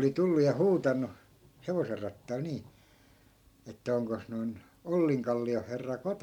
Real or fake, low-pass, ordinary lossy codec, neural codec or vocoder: real; 19.8 kHz; MP3, 96 kbps; none